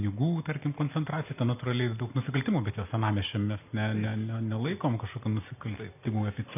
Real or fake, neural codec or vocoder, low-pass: real; none; 3.6 kHz